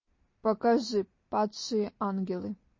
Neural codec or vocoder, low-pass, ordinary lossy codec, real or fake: none; 7.2 kHz; MP3, 32 kbps; real